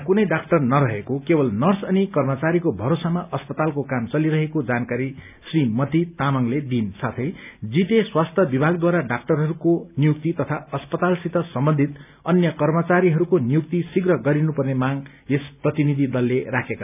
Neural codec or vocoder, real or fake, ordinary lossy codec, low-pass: none; real; none; 3.6 kHz